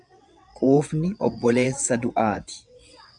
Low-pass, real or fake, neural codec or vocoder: 9.9 kHz; fake; vocoder, 22.05 kHz, 80 mel bands, WaveNeXt